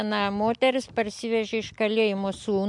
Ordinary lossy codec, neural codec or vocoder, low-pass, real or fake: MP3, 64 kbps; none; 10.8 kHz; real